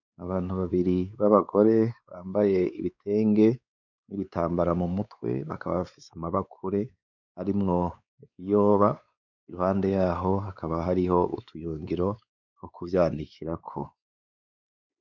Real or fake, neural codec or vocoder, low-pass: fake; codec, 16 kHz, 4 kbps, X-Codec, WavLM features, trained on Multilingual LibriSpeech; 7.2 kHz